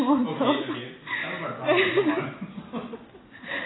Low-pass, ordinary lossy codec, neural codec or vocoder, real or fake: 7.2 kHz; AAC, 16 kbps; none; real